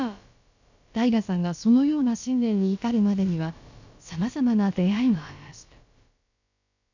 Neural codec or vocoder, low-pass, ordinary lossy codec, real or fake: codec, 16 kHz, about 1 kbps, DyCAST, with the encoder's durations; 7.2 kHz; none; fake